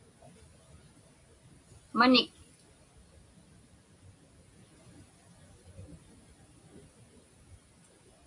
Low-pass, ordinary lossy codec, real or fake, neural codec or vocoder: 10.8 kHz; AAC, 48 kbps; real; none